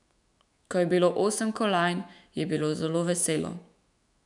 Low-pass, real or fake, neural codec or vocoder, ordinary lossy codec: 10.8 kHz; fake; autoencoder, 48 kHz, 128 numbers a frame, DAC-VAE, trained on Japanese speech; none